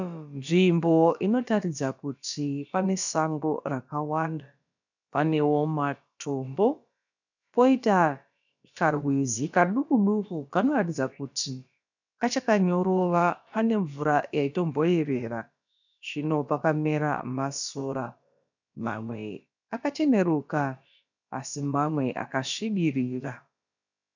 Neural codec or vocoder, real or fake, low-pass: codec, 16 kHz, about 1 kbps, DyCAST, with the encoder's durations; fake; 7.2 kHz